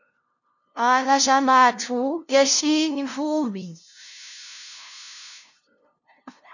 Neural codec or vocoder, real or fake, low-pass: codec, 16 kHz, 0.5 kbps, FunCodec, trained on LibriTTS, 25 frames a second; fake; 7.2 kHz